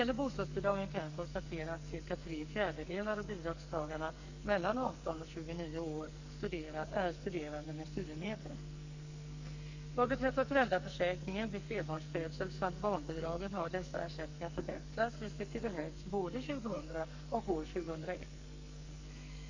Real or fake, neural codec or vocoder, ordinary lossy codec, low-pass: fake; codec, 44.1 kHz, 2.6 kbps, SNAC; Opus, 64 kbps; 7.2 kHz